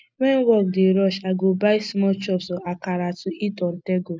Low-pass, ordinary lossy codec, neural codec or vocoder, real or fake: none; none; none; real